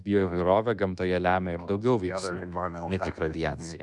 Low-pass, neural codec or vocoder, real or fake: 10.8 kHz; codec, 24 kHz, 1.2 kbps, DualCodec; fake